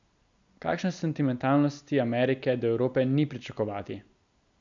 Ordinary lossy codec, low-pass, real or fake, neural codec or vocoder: none; 7.2 kHz; real; none